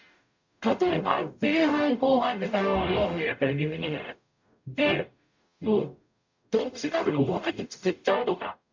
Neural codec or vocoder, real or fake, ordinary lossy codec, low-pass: codec, 44.1 kHz, 0.9 kbps, DAC; fake; MP3, 64 kbps; 7.2 kHz